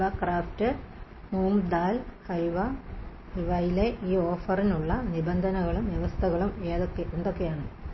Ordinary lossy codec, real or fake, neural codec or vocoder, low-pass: MP3, 24 kbps; real; none; 7.2 kHz